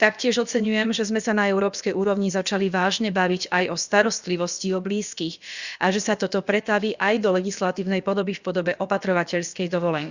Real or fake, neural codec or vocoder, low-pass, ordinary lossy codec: fake; codec, 16 kHz, about 1 kbps, DyCAST, with the encoder's durations; 7.2 kHz; Opus, 64 kbps